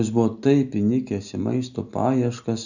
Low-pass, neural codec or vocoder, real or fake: 7.2 kHz; none; real